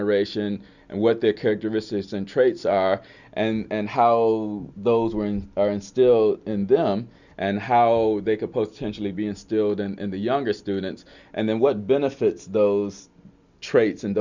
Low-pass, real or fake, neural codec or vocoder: 7.2 kHz; real; none